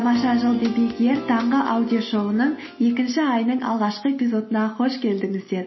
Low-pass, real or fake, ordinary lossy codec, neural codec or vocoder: 7.2 kHz; real; MP3, 24 kbps; none